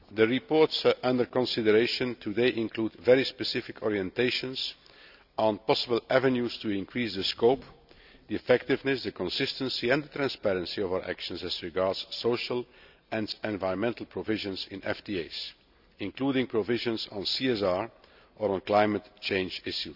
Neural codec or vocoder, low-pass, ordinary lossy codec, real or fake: none; 5.4 kHz; none; real